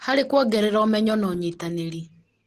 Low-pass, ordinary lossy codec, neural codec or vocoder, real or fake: 14.4 kHz; Opus, 16 kbps; none; real